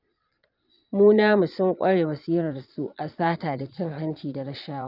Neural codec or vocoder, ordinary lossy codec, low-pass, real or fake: vocoder, 22.05 kHz, 80 mel bands, Vocos; none; 5.4 kHz; fake